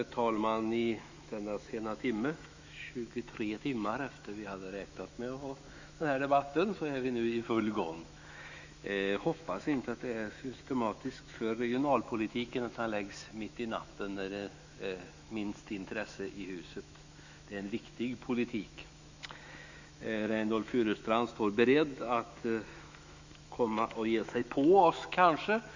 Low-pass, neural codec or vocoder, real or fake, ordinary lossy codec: 7.2 kHz; autoencoder, 48 kHz, 128 numbers a frame, DAC-VAE, trained on Japanese speech; fake; Opus, 64 kbps